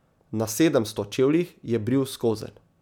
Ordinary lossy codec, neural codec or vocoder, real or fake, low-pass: none; none; real; 19.8 kHz